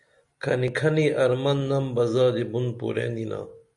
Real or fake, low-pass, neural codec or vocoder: real; 10.8 kHz; none